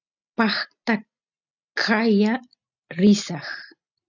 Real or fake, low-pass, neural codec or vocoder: real; 7.2 kHz; none